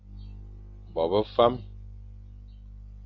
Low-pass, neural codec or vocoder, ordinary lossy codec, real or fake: 7.2 kHz; none; AAC, 48 kbps; real